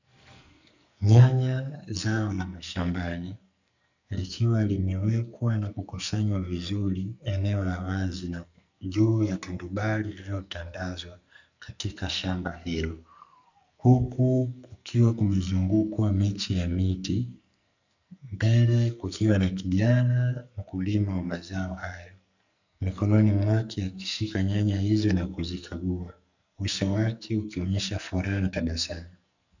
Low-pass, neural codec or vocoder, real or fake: 7.2 kHz; codec, 44.1 kHz, 2.6 kbps, SNAC; fake